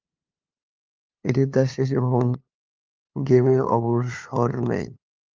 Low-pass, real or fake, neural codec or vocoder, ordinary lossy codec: 7.2 kHz; fake; codec, 16 kHz, 2 kbps, FunCodec, trained on LibriTTS, 25 frames a second; Opus, 24 kbps